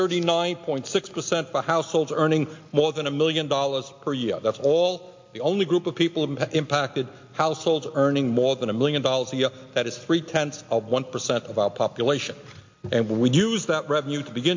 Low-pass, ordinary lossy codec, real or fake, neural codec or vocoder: 7.2 kHz; MP3, 48 kbps; real; none